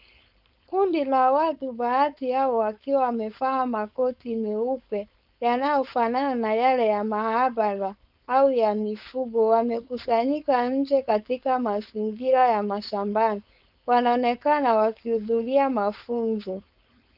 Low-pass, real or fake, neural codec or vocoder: 5.4 kHz; fake; codec, 16 kHz, 4.8 kbps, FACodec